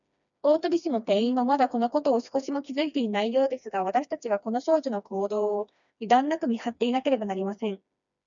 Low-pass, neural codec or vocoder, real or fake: 7.2 kHz; codec, 16 kHz, 2 kbps, FreqCodec, smaller model; fake